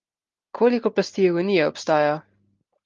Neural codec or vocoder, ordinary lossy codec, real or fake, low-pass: none; Opus, 32 kbps; real; 7.2 kHz